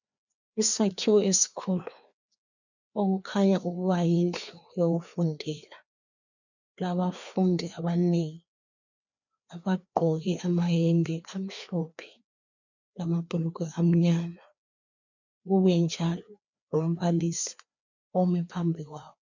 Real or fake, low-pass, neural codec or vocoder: fake; 7.2 kHz; codec, 16 kHz, 2 kbps, FreqCodec, larger model